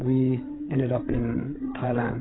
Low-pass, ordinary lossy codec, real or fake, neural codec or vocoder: 7.2 kHz; AAC, 16 kbps; fake; codec, 16 kHz, 8 kbps, FreqCodec, larger model